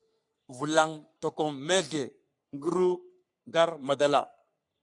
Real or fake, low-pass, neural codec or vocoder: fake; 10.8 kHz; codec, 44.1 kHz, 3.4 kbps, Pupu-Codec